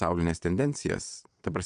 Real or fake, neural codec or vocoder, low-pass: fake; vocoder, 22.05 kHz, 80 mel bands, WaveNeXt; 9.9 kHz